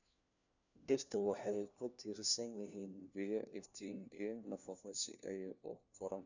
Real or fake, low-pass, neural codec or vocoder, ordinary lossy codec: fake; 7.2 kHz; codec, 16 kHz, 1 kbps, FunCodec, trained on LibriTTS, 50 frames a second; none